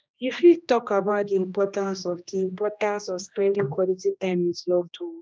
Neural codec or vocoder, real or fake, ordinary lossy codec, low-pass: codec, 16 kHz, 1 kbps, X-Codec, HuBERT features, trained on general audio; fake; none; none